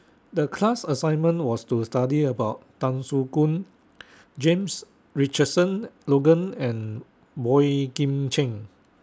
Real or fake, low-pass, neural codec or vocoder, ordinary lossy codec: real; none; none; none